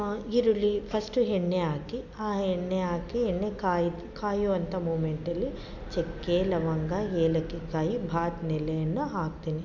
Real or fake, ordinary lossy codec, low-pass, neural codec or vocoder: real; none; 7.2 kHz; none